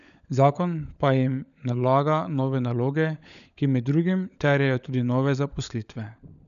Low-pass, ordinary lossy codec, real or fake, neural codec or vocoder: 7.2 kHz; none; fake; codec, 16 kHz, 16 kbps, FunCodec, trained on LibriTTS, 50 frames a second